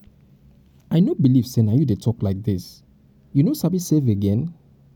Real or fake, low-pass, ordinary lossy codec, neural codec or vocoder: real; 19.8 kHz; none; none